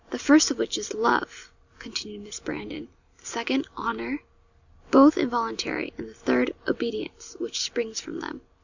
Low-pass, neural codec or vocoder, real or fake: 7.2 kHz; none; real